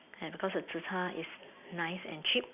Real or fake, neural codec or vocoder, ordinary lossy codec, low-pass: real; none; none; 3.6 kHz